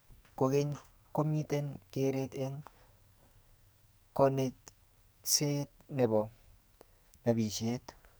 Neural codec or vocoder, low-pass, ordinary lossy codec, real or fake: codec, 44.1 kHz, 2.6 kbps, SNAC; none; none; fake